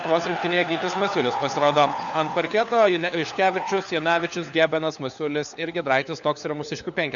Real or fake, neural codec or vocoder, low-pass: fake; codec, 16 kHz, 4 kbps, FunCodec, trained on LibriTTS, 50 frames a second; 7.2 kHz